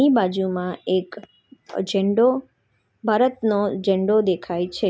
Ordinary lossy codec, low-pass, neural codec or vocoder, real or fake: none; none; none; real